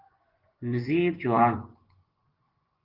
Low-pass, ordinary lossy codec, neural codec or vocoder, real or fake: 5.4 kHz; Opus, 16 kbps; vocoder, 24 kHz, 100 mel bands, Vocos; fake